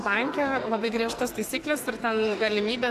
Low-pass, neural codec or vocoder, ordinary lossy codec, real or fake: 14.4 kHz; codec, 44.1 kHz, 2.6 kbps, SNAC; AAC, 64 kbps; fake